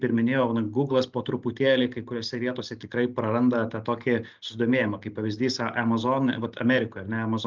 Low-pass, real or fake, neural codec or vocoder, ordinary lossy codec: 7.2 kHz; real; none; Opus, 24 kbps